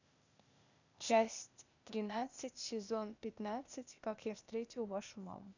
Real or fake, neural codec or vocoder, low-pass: fake; codec, 16 kHz, 0.8 kbps, ZipCodec; 7.2 kHz